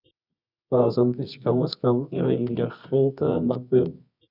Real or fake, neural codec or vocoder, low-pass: fake; codec, 24 kHz, 0.9 kbps, WavTokenizer, medium music audio release; 5.4 kHz